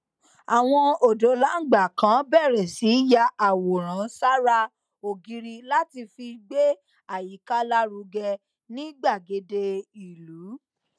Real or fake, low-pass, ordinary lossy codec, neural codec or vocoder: real; none; none; none